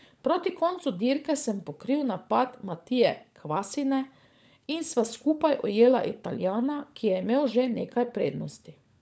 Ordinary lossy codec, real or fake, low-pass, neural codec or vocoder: none; fake; none; codec, 16 kHz, 16 kbps, FunCodec, trained on LibriTTS, 50 frames a second